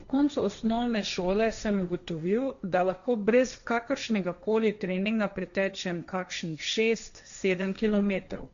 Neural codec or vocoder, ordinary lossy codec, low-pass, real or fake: codec, 16 kHz, 1.1 kbps, Voila-Tokenizer; none; 7.2 kHz; fake